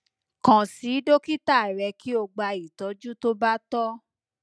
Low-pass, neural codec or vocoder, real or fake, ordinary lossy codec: none; none; real; none